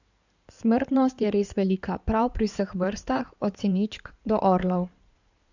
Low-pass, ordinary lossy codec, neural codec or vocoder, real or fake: 7.2 kHz; none; codec, 16 kHz in and 24 kHz out, 2.2 kbps, FireRedTTS-2 codec; fake